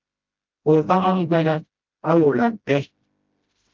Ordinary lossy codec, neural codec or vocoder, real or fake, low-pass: Opus, 24 kbps; codec, 16 kHz, 0.5 kbps, FreqCodec, smaller model; fake; 7.2 kHz